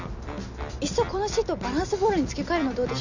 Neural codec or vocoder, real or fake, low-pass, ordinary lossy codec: none; real; 7.2 kHz; none